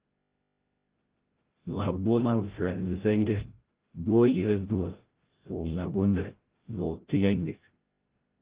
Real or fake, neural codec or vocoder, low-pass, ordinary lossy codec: fake; codec, 16 kHz, 0.5 kbps, FreqCodec, larger model; 3.6 kHz; Opus, 16 kbps